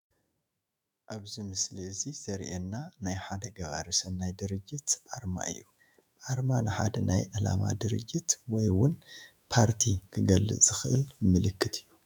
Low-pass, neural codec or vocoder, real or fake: 19.8 kHz; autoencoder, 48 kHz, 128 numbers a frame, DAC-VAE, trained on Japanese speech; fake